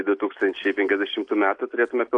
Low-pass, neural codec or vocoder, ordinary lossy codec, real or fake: 9.9 kHz; none; AAC, 48 kbps; real